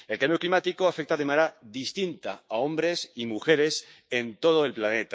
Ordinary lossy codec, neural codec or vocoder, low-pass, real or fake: none; codec, 16 kHz, 6 kbps, DAC; none; fake